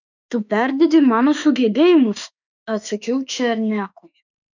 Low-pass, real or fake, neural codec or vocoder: 7.2 kHz; fake; autoencoder, 48 kHz, 32 numbers a frame, DAC-VAE, trained on Japanese speech